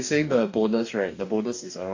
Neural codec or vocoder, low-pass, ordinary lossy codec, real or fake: codec, 44.1 kHz, 2.6 kbps, DAC; 7.2 kHz; AAC, 48 kbps; fake